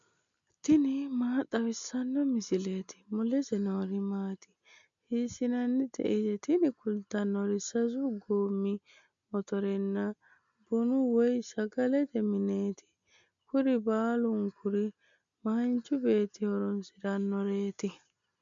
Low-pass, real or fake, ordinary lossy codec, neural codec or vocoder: 7.2 kHz; real; MP3, 64 kbps; none